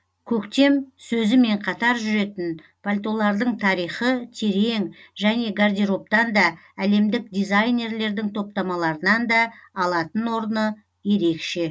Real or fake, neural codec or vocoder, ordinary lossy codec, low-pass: real; none; none; none